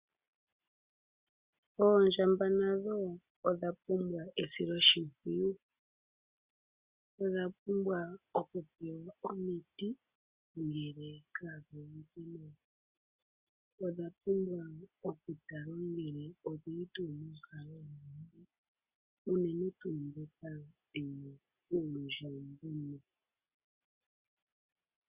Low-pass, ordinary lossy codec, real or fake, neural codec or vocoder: 3.6 kHz; Opus, 64 kbps; real; none